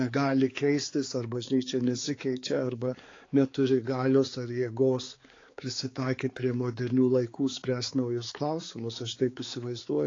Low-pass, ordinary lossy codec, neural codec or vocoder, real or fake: 7.2 kHz; AAC, 32 kbps; codec, 16 kHz, 4 kbps, X-Codec, HuBERT features, trained on balanced general audio; fake